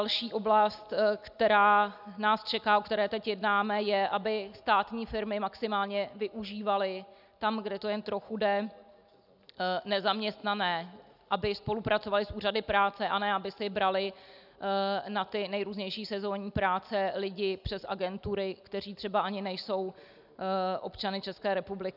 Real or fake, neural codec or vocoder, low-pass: real; none; 5.4 kHz